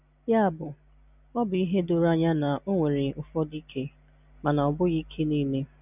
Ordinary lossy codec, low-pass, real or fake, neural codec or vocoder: none; 3.6 kHz; real; none